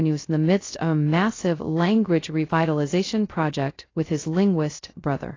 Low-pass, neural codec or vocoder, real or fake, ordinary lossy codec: 7.2 kHz; codec, 16 kHz, 0.2 kbps, FocalCodec; fake; AAC, 32 kbps